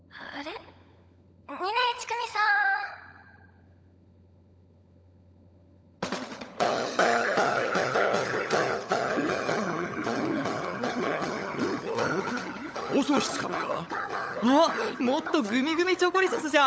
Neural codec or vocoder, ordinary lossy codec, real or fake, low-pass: codec, 16 kHz, 16 kbps, FunCodec, trained on LibriTTS, 50 frames a second; none; fake; none